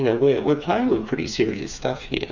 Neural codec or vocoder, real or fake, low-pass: codec, 16 kHz, 4 kbps, FreqCodec, smaller model; fake; 7.2 kHz